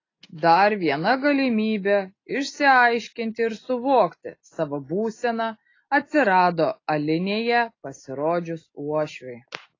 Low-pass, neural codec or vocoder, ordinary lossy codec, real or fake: 7.2 kHz; none; AAC, 32 kbps; real